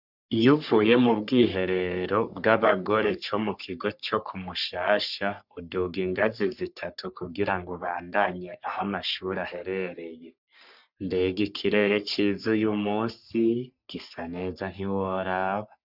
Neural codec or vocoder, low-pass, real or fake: codec, 44.1 kHz, 3.4 kbps, Pupu-Codec; 5.4 kHz; fake